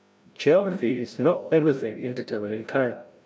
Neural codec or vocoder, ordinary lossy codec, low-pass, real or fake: codec, 16 kHz, 0.5 kbps, FreqCodec, larger model; none; none; fake